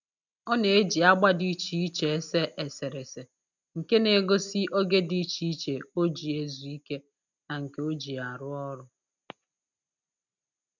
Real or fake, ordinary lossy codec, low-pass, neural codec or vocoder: real; none; 7.2 kHz; none